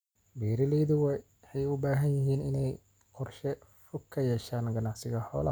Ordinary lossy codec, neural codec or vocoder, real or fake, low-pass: none; none; real; none